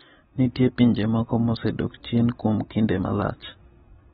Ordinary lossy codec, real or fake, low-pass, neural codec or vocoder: AAC, 16 kbps; real; 19.8 kHz; none